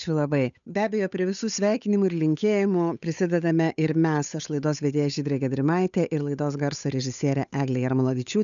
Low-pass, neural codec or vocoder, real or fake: 7.2 kHz; codec, 16 kHz, 8 kbps, FunCodec, trained on Chinese and English, 25 frames a second; fake